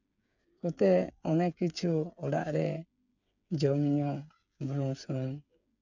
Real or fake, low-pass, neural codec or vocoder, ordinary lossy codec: fake; 7.2 kHz; codec, 16 kHz, 8 kbps, FreqCodec, smaller model; none